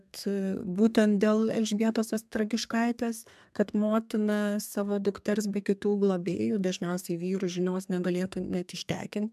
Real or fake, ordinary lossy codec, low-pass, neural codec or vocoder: fake; MP3, 96 kbps; 14.4 kHz; codec, 32 kHz, 1.9 kbps, SNAC